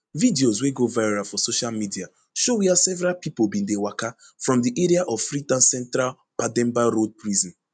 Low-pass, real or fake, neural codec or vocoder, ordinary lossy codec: 9.9 kHz; real; none; none